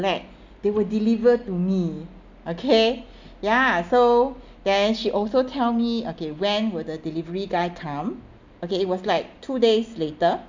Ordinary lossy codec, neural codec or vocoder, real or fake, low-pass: MP3, 64 kbps; none; real; 7.2 kHz